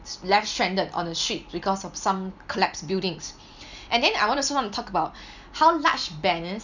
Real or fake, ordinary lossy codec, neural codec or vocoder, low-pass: real; none; none; 7.2 kHz